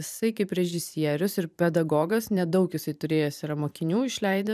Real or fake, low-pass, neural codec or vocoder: fake; 14.4 kHz; vocoder, 44.1 kHz, 128 mel bands every 512 samples, BigVGAN v2